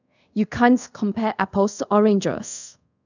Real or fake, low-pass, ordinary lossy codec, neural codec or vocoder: fake; 7.2 kHz; none; codec, 24 kHz, 0.5 kbps, DualCodec